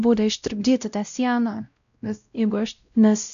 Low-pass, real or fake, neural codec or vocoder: 7.2 kHz; fake; codec, 16 kHz, 0.5 kbps, X-Codec, WavLM features, trained on Multilingual LibriSpeech